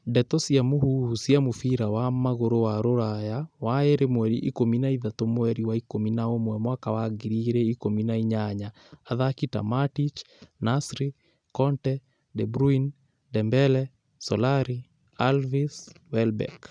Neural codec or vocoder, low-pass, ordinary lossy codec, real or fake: none; 9.9 kHz; none; real